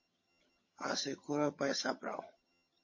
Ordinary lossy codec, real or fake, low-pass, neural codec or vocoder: MP3, 32 kbps; fake; 7.2 kHz; vocoder, 22.05 kHz, 80 mel bands, HiFi-GAN